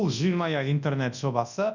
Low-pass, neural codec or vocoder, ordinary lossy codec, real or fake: 7.2 kHz; codec, 24 kHz, 0.9 kbps, WavTokenizer, large speech release; none; fake